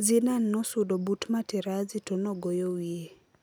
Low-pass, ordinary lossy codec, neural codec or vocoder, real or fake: none; none; none; real